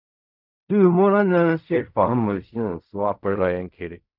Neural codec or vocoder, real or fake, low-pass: codec, 16 kHz in and 24 kHz out, 0.4 kbps, LongCat-Audio-Codec, fine tuned four codebook decoder; fake; 5.4 kHz